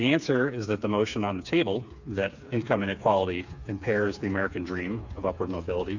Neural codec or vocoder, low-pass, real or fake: codec, 16 kHz, 4 kbps, FreqCodec, smaller model; 7.2 kHz; fake